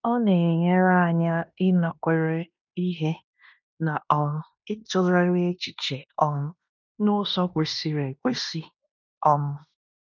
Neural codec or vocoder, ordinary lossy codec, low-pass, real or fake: codec, 16 kHz in and 24 kHz out, 0.9 kbps, LongCat-Audio-Codec, fine tuned four codebook decoder; none; 7.2 kHz; fake